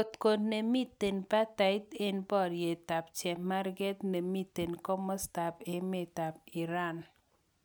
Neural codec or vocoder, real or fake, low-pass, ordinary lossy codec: none; real; none; none